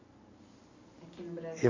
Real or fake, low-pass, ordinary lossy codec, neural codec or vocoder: real; 7.2 kHz; AAC, 32 kbps; none